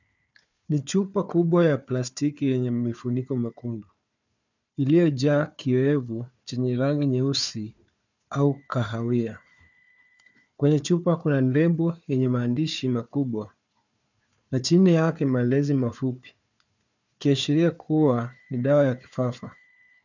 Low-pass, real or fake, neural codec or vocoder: 7.2 kHz; fake; codec, 16 kHz, 4 kbps, FunCodec, trained on Chinese and English, 50 frames a second